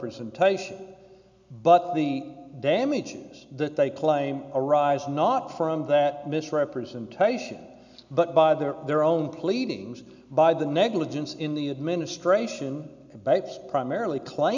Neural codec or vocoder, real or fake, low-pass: none; real; 7.2 kHz